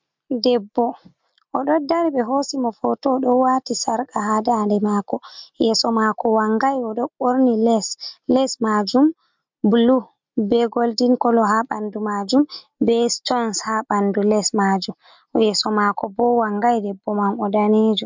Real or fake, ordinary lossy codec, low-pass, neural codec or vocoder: real; MP3, 64 kbps; 7.2 kHz; none